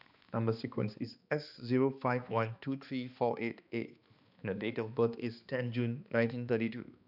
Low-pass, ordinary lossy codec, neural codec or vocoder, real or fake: 5.4 kHz; none; codec, 16 kHz, 2 kbps, X-Codec, HuBERT features, trained on balanced general audio; fake